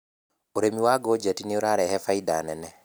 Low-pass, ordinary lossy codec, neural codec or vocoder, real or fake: none; none; none; real